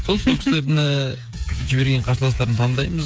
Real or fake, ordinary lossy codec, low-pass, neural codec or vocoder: fake; none; none; codec, 16 kHz, 8 kbps, FreqCodec, smaller model